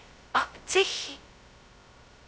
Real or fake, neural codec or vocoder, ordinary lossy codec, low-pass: fake; codec, 16 kHz, 0.2 kbps, FocalCodec; none; none